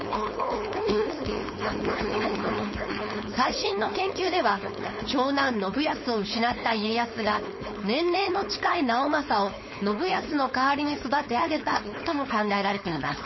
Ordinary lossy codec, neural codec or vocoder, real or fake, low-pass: MP3, 24 kbps; codec, 16 kHz, 4.8 kbps, FACodec; fake; 7.2 kHz